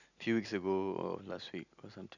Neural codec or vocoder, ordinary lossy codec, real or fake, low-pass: none; none; real; 7.2 kHz